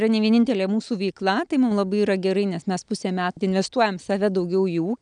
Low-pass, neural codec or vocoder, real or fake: 9.9 kHz; none; real